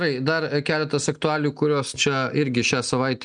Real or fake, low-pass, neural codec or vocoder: real; 9.9 kHz; none